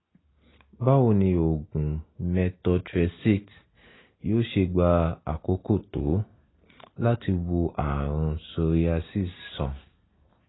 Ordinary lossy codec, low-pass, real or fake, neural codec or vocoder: AAC, 16 kbps; 7.2 kHz; real; none